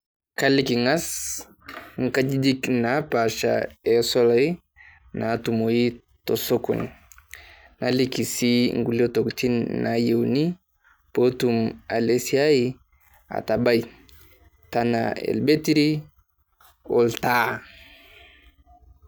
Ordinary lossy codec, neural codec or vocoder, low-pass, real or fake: none; none; none; real